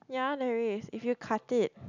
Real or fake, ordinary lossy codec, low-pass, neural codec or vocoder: real; none; 7.2 kHz; none